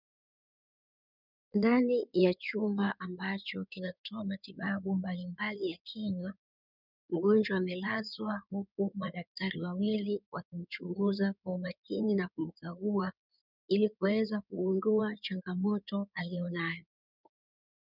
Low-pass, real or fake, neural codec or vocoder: 5.4 kHz; fake; codec, 16 kHz in and 24 kHz out, 2.2 kbps, FireRedTTS-2 codec